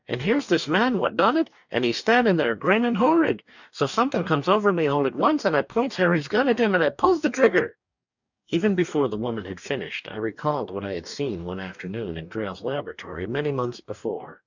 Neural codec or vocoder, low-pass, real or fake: codec, 44.1 kHz, 2.6 kbps, DAC; 7.2 kHz; fake